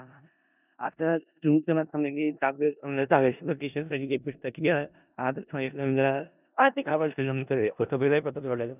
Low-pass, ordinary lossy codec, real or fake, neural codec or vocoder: 3.6 kHz; none; fake; codec, 16 kHz in and 24 kHz out, 0.4 kbps, LongCat-Audio-Codec, four codebook decoder